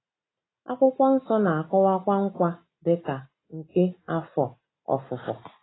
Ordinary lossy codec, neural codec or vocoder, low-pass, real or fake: AAC, 16 kbps; none; 7.2 kHz; real